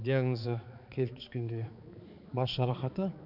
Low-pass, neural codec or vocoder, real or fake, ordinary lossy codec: 5.4 kHz; codec, 16 kHz, 4 kbps, X-Codec, HuBERT features, trained on balanced general audio; fake; none